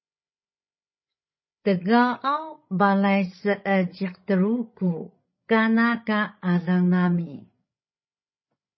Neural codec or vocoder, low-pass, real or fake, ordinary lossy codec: codec, 16 kHz, 16 kbps, FreqCodec, larger model; 7.2 kHz; fake; MP3, 24 kbps